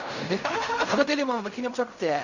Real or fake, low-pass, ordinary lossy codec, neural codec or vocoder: fake; 7.2 kHz; none; codec, 16 kHz in and 24 kHz out, 0.4 kbps, LongCat-Audio-Codec, fine tuned four codebook decoder